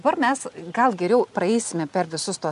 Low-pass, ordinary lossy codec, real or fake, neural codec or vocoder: 10.8 kHz; MP3, 64 kbps; real; none